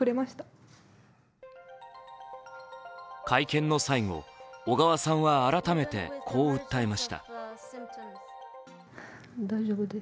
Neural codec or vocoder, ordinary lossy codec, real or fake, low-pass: none; none; real; none